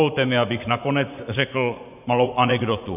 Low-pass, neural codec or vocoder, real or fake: 3.6 kHz; vocoder, 24 kHz, 100 mel bands, Vocos; fake